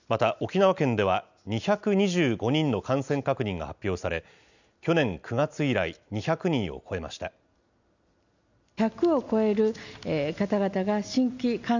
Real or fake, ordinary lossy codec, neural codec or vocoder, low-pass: real; none; none; 7.2 kHz